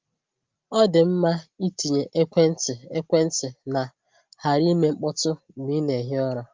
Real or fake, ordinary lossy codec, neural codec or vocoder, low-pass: real; Opus, 24 kbps; none; 7.2 kHz